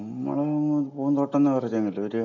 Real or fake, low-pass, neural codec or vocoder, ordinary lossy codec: real; 7.2 kHz; none; none